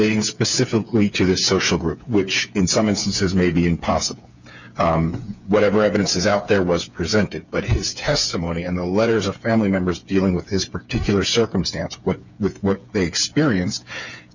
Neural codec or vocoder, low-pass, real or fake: codec, 16 kHz, 8 kbps, FreqCodec, smaller model; 7.2 kHz; fake